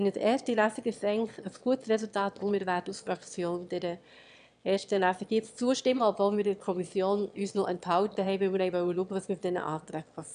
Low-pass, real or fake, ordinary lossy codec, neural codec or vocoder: 9.9 kHz; fake; none; autoencoder, 22.05 kHz, a latent of 192 numbers a frame, VITS, trained on one speaker